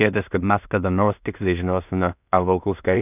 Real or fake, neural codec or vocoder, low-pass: fake; codec, 16 kHz in and 24 kHz out, 0.4 kbps, LongCat-Audio-Codec, two codebook decoder; 3.6 kHz